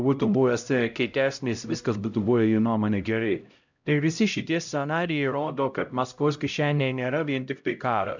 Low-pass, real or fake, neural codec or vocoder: 7.2 kHz; fake; codec, 16 kHz, 0.5 kbps, X-Codec, HuBERT features, trained on LibriSpeech